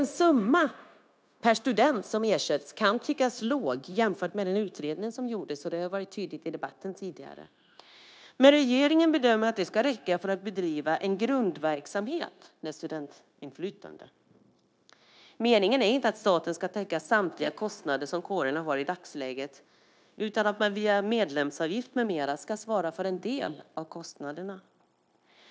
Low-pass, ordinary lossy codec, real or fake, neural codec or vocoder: none; none; fake; codec, 16 kHz, 0.9 kbps, LongCat-Audio-Codec